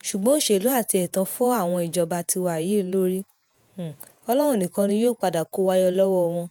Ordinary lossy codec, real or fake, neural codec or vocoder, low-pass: none; fake; vocoder, 48 kHz, 128 mel bands, Vocos; none